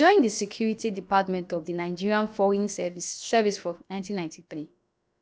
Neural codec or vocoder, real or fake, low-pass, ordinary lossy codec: codec, 16 kHz, about 1 kbps, DyCAST, with the encoder's durations; fake; none; none